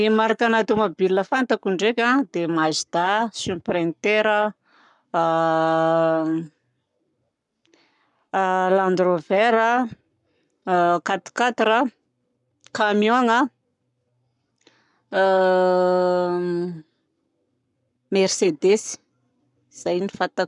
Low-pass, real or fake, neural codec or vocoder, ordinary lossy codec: 10.8 kHz; fake; codec, 44.1 kHz, 7.8 kbps, Pupu-Codec; none